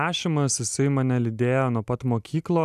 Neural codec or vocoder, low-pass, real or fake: none; 14.4 kHz; real